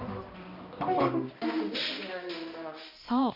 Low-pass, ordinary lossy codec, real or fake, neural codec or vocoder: 5.4 kHz; MP3, 32 kbps; fake; codec, 16 kHz, 1 kbps, X-Codec, HuBERT features, trained on balanced general audio